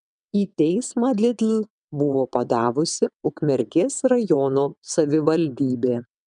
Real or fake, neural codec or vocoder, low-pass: fake; vocoder, 22.05 kHz, 80 mel bands, WaveNeXt; 9.9 kHz